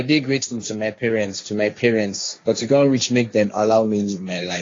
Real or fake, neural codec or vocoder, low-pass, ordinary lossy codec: fake; codec, 16 kHz, 1.1 kbps, Voila-Tokenizer; 7.2 kHz; AAC, 32 kbps